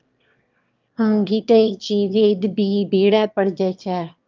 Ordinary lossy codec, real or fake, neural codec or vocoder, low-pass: Opus, 24 kbps; fake; autoencoder, 22.05 kHz, a latent of 192 numbers a frame, VITS, trained on one speaker; 7.2 kHz